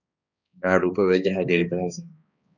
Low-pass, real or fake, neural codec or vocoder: 7.2 kHz; fake; codec, 16 kHz, 2 kbps, X-Codec, HuBERT features, trained on balanced general audio